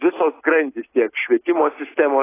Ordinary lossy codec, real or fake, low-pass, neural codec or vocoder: AAC, 16 kbps; real; 3.6 kHz; none